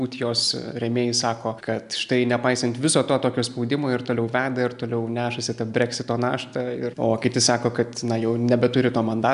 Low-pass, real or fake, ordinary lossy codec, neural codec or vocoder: 10.8 kHz; real; MP3, 96 kbps; none